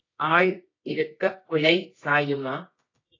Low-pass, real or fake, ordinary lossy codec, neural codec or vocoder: 7.2 kHz; fake; AAC, 32 kbps; codec, 24 kHz, 0.9 kbps, WavTokenizer, medium music audio release